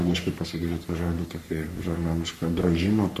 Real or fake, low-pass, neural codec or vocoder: fake; 14.4 kHz; codec, 44.1 kHz, 3.4 kbps, Pupu-Codec